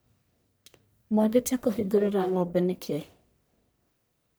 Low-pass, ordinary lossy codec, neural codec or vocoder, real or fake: none; none; codec, 44.1 kHz, 1.7 kbps, Pupu-Codec; fake